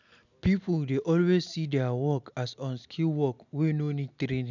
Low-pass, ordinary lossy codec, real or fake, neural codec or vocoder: 7.2 kHz; none; real; none